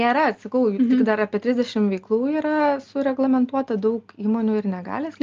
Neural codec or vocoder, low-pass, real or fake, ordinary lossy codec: none; 7.2 kHz; real; Opus, 32 kbps